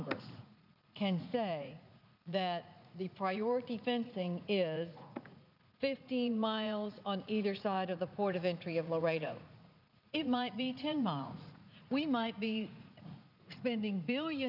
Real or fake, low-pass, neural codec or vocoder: fake; 5.4 kHz; vocoder, 44.1 kHz, 80 mel bands, Vocos